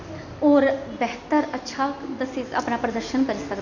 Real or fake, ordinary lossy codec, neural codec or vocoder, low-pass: real; none; none; 7.2 kHz